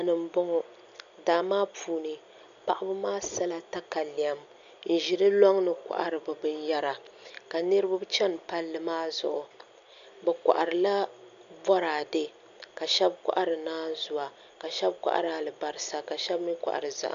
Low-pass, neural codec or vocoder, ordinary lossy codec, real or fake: 7.2 kHz; none; MP3, 64 kbps; real